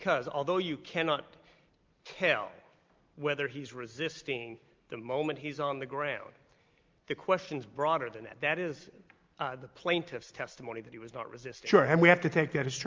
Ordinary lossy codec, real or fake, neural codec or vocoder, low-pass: Opus, 32 kbps; real; none; 7.2 kHz